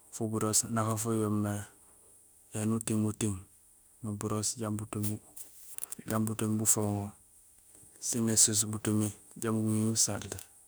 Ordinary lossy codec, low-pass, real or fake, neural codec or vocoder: none; none; fake; autoencoder, 48 kHz, 32 numbers a frame, DAC-VAE, trained on Japanese speech